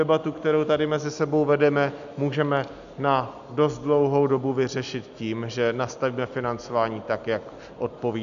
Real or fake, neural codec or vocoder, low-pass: real; none; 7.2 kHz